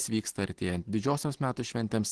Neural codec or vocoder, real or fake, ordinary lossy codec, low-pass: none; real; Opus, 16 kbps; 10.8 kHz